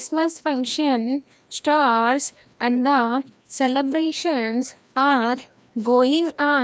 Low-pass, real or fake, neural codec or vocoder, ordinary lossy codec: none; fake; codec, 16 kHz, 1 kbps, FreqCodec, larger model; none